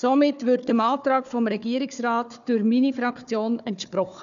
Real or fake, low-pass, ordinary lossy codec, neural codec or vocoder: fake; 7.2 kHz; none; codec, 16 kHz, 4 kbps, FunCodec, trained on Chinese and English, 50 frames a second